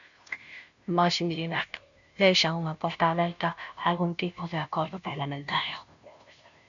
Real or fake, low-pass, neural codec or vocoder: fake; 7.2 kHz; codec, 16 kHz, 0.5 kbps, FunCodec, trained on Chinese and English, 25 frames a second